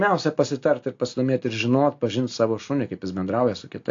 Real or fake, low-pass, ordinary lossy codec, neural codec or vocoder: real; 7.2 kHz; AAC, 48 kbps; none